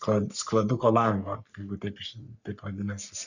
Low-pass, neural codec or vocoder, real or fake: 7.2 kHz; codec, 44.1 kHz, 3.4 kbps, Pupu-Codec; fake